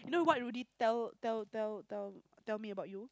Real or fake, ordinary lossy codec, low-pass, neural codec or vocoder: real; none; none; none